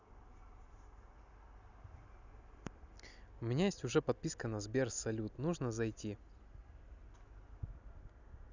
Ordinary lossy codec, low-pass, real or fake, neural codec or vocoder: none; 7.2 kHz; real; none